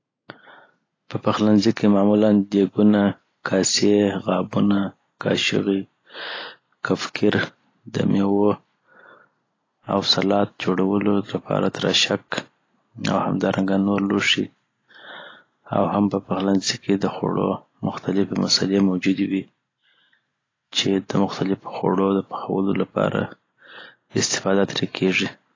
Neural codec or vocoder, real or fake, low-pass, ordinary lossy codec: none; real; 7.2 kHz; AAC, 32 kbps